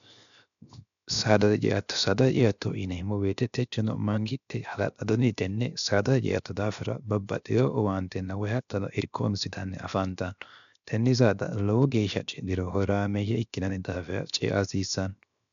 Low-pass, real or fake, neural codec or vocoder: 7.2 kHz; fake; codec, 16 kHz, 0.7 kbps, FocalCodec